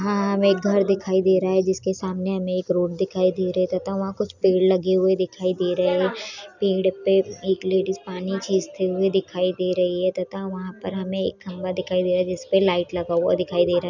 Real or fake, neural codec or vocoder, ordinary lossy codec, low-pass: real; none; none; 7.2 kHz